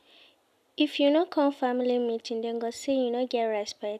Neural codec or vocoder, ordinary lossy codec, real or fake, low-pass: none; none; real; 14.4 kHz